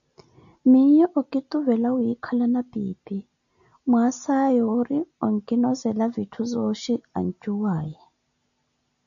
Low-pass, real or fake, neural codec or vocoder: 7.2 kHz; real; none